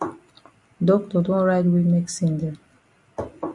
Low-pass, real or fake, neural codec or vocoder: 10.8 kHz; real; none